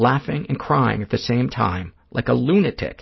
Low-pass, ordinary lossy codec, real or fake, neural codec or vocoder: 7.2 kHz; MP3, 24 kbps; real; none